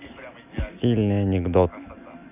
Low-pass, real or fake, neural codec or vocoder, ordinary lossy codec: 3.6 kHz; real; none; none